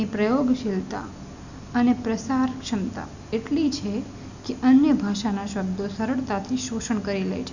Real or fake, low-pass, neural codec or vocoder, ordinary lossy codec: real; 7.2 kHz; none; none